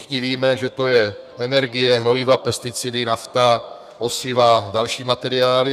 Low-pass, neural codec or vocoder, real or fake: 14.4 kHz; codec, 32 kHz, 1.9 kbps, SNAC; fake